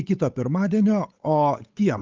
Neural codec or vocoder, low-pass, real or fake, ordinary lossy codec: codec, 16 kHz, 8 kbps, FunCodec, trained on Chinese and English, 25 frames a second; 7.2 kHz; fake; Opus, 32 kbps